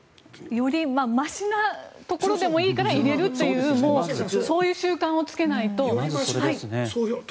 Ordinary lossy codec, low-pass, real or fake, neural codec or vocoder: none; none; real; none